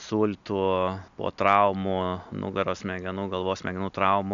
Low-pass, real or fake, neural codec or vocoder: 7.2 kHz; real; none